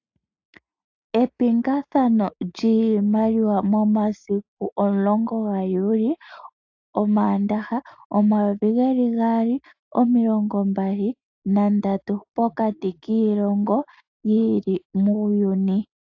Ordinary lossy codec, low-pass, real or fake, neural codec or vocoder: AAC, 48 kbps; 7.2 kHz; real; none